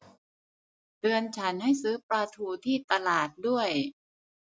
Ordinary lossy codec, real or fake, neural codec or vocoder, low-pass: none; real; none; none